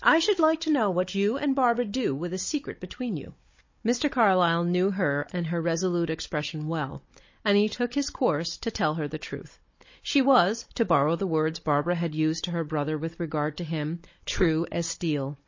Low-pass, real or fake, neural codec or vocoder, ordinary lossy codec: 7.2 kHz; fake; codec, 16 kHz, 16 kbps, FunCodec, trained on Chinese and English, 50 frames a second; MP3, 32 kbps